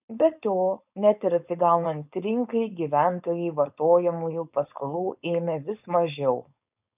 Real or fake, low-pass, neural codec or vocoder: fake; 3.6 kHz; codec, 16 kHz, 4.8 kbps, FACodec